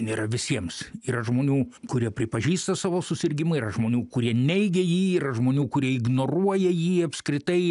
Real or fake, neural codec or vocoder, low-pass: real; none; 10.8 kHz